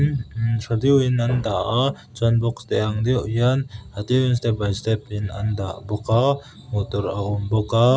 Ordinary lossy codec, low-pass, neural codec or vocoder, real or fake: none; none; none; real